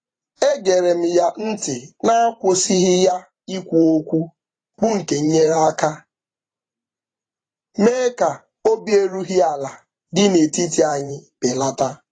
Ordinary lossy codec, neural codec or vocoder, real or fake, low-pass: AAC, 32 kbps; vocoder, 44.1 kHz, 128 mel bands every 512 samples, BigVGAN v2; fake; 9.9 kHz